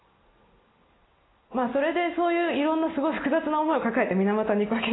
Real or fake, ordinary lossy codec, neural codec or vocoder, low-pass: real; AAC, 16 kbps; none; 7.2 kHz